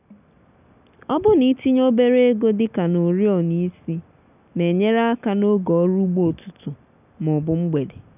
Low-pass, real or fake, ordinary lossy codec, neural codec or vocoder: 3.6 kHz; real; none; none